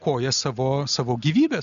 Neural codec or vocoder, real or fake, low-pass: none; real; 7.2 kHz